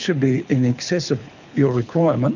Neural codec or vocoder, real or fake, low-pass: codec, 24 kHz, 6 kbps, HILCodec; fake; 7.2 kHz